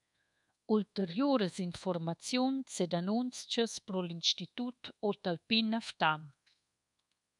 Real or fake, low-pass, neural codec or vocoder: fake; 10.8 kHz; codec, 24 kHz, 1.2 kbps, DualCodec